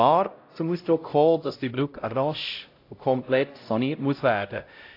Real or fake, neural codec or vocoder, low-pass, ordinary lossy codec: fake; codec, 16 kHz, 0.5 kbps, X-Codec, HuBERT features, trained on LibriSpeech; 5.4 kHz; AAC, 32 kbps